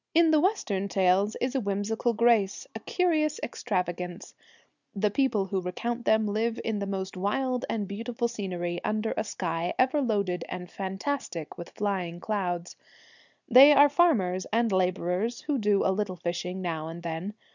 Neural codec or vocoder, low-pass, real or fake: none; 7.2 kHz; real